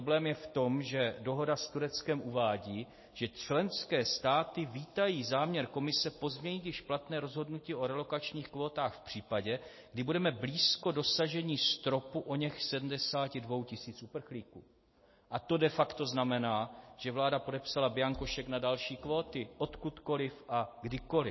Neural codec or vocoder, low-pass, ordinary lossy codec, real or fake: none; 7.2 kHz; MP3, 24 kbps; real